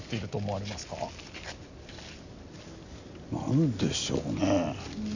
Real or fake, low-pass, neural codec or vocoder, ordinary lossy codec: real; 7.2 kHz; none; none